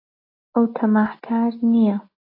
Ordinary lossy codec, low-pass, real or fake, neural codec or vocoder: AAC, 32 kbps; 5.4 kHz; real; none